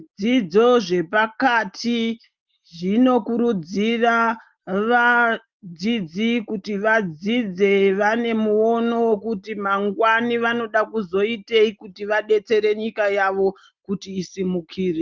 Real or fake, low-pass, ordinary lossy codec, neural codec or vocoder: real; 7.2 kHz; Opus, 32 kbps; none